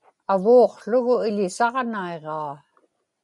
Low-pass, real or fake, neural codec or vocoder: 10.8 kHz; real; none